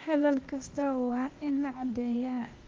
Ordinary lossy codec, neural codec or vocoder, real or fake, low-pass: Opus, 24 kbps; codec, 16 kHz, 0.8 kbps, ZipCodec; fake; 7.2 kHz